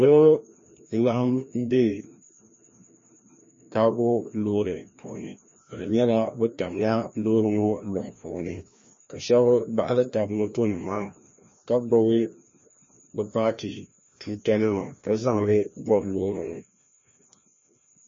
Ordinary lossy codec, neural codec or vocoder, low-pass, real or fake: MP3, 32 kbps; codec, 16 kHz, 1 kbps, FreqCodec, larger model; 7.2 kHz; fake